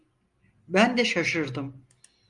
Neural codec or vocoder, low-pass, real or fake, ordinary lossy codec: none; 10.8 kHz; real; Opus, 32 kbps